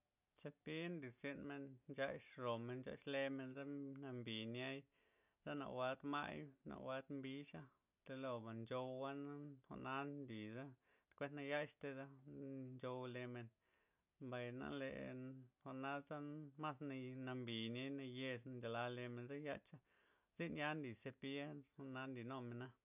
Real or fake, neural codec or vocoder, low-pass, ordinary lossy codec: real; none; 3.6 kHz; none